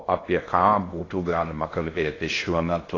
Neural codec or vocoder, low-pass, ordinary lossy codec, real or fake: codec, 16 kHz in and 24 kHz out, 0.6 kbps, FocalCodec, streaming, 2048 codes; 7.2 kHz; AAC, 32 kbps; fake